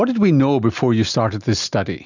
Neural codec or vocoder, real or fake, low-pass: none; real; 7.2 kHz